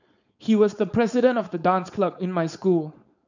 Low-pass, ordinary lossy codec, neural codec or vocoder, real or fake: 7.2 kHz; none; codec, 16 kHz, 4.8 kbps, FACodec; fake